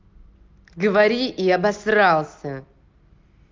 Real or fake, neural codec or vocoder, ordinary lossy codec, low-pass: real; none; Opus, 32 kbps; 7.2 kHz